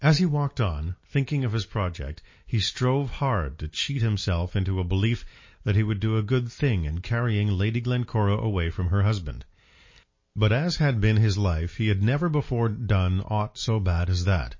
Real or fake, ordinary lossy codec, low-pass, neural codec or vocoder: real; MP3, 32 kbps; 7.2 kHz; none